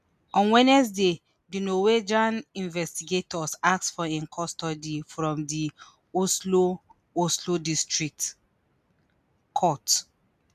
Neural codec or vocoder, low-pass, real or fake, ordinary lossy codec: none; 14.4 kHz; real; none